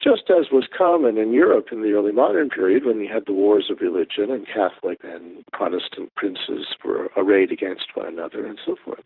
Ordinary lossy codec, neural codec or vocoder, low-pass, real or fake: Opus, 24 kbps; vocoder, 44.1 kHz, 128 mel bands every 512 samples, BigVGAN v2; 5.4 kHz; fake